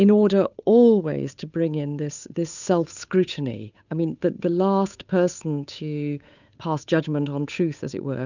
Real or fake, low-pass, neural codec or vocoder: fake; 7.2 kHz; codec, 16 kHz, 8 kbps, FunCodec, trained on Chinese and English, 25 frames a second